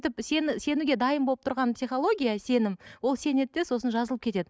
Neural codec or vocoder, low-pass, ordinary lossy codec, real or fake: none; none; none; real